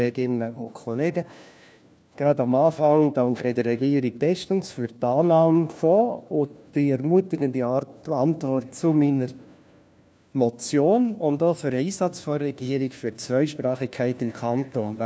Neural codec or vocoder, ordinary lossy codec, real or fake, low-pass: codec, 16 kHz, 1 kbps, FunCodec, trained on LibriTTS, 50 frames a second; none; fake; none